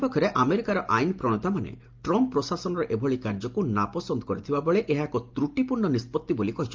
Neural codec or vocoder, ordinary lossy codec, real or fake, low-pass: none; Opus, 32 kbps; real; 7.2 kHz